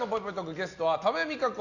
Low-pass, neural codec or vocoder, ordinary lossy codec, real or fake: 7.2 kHz; none; none; real